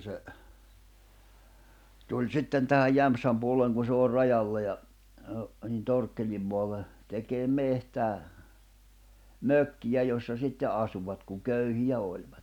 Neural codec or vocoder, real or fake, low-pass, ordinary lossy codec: none; real; 19.8 kHz; none